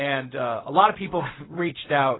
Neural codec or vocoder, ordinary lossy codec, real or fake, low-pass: codec, 16 kHz, 0.4 kbps, LongCat-Audio-Codec; AAC, 16 kbps; fake; 7.2 kHz